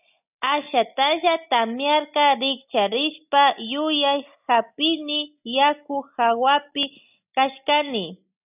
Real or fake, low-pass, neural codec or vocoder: real; 3.6 kHz; none